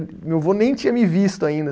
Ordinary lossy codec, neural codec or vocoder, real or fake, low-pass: none; none; real; none